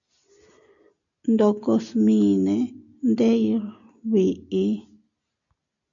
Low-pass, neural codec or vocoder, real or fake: 7.2 kHz; none; real